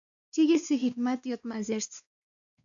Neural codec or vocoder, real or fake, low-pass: codec, 16 kHz, 2 kbps, X-Codec, WavLM features, trained on Multilingual LibriSpeech; fake; 7.2 kHz